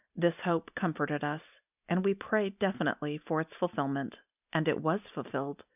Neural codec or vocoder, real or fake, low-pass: none; real; 3.6 kHz